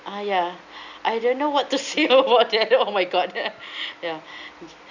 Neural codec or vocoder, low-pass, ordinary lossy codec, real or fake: none; 7.2 kHz; none; real